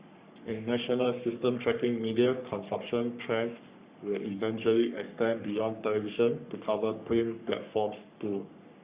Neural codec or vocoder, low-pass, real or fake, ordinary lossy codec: codec, 44.1 kHz, 3.4 kbps, Pupu-Codec; 3.6 kHz; fake; Opus, 64 kbps